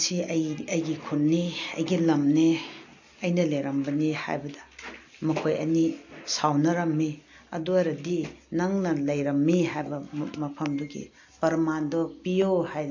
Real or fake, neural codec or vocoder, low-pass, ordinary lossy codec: real; none; 7.2 kHz; AAC, 48 kbps